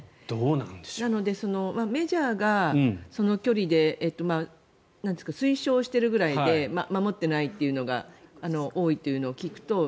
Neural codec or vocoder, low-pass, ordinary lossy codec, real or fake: none; none; none; real